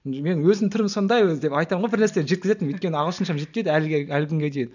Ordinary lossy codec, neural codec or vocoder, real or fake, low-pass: none; none; real; 7.2 kHz